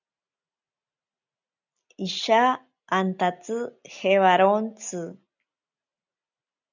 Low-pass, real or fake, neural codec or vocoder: 7.2 kHz; real; none